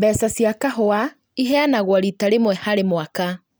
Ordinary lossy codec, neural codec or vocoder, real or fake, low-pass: none; none; real; none